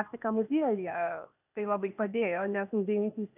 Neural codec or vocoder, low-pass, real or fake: codec, 16 kHz, 0.8 kbps, ZipCodec; 3.6 kHz; fake